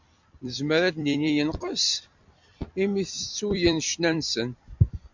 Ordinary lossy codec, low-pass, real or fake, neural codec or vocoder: MP3, 64 kbps; 7.2 kHz; fake; vocoder, 44.1 kHz, 128 mel bands every 256 samples, BigVGAN v2